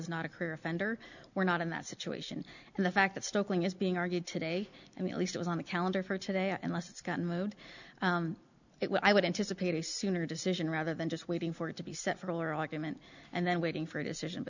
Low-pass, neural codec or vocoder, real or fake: 7.2 kHz; none; real